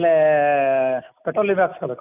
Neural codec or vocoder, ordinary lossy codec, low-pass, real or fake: none; none; 3.6 kHz; real